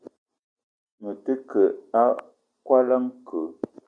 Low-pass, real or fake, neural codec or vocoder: 9.9 kHz; real; none